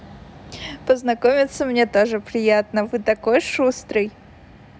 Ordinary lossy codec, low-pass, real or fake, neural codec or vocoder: none; none; real; none